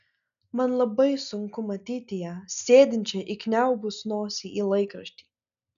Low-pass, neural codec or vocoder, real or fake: 7.2 kHz; none; real